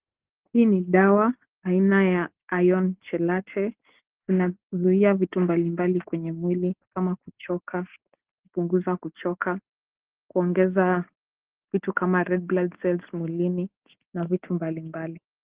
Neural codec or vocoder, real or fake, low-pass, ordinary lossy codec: none; real; 3.6 kHz; Opus, 16 kbps